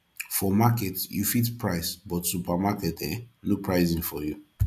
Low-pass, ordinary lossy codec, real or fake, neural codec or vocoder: 14.4 kHz; none; real; none